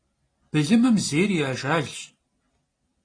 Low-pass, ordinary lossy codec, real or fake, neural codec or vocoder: 9.9 kHz; AAC, 32 kbps; real; none